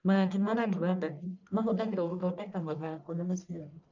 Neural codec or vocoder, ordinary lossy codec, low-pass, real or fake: codec, 44.1 kHz, 1.7 kbps, Pupu-Codec; none; 7.2 kHz; fake